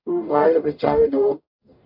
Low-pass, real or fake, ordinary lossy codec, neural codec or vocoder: 5.4 kHz; fake; AAC, 48 kbps; codec, 44.1 kHz, 0.9 kbps, DAC